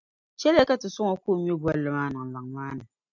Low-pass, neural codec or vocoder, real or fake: 7.2 kHz; none; real